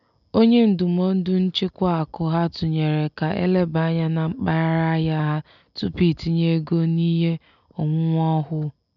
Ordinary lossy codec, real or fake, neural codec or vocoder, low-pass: none; real; none; 7.2 kHz